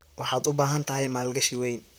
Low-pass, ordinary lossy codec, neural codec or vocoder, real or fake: none; none; vocoder, 44.1 kHz, 128 mel bands, Pupu-Vocoder; fake